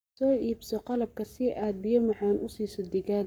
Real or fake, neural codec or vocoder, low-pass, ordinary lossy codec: fake; codec, 44.1 kHz, 7.8 kbps, Pupu-Codec; none; none